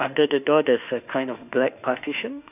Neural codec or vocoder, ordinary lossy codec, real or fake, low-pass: autoencoder, 48 kHz, 32 numbers a frame, DAC-VAE, trained on Japanese speech; none; fake; 3.6 kHz